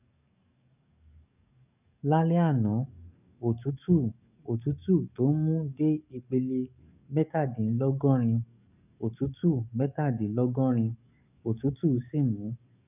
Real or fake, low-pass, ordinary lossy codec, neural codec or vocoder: fake; 3.6 kHz; none; codec, 44.1 kHz, 7.8 kbps, DAC